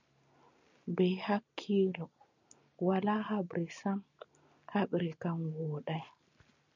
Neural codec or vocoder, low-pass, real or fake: none; 7.2 kHz; real